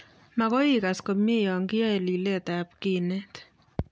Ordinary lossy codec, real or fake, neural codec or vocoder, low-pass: none; real; none; none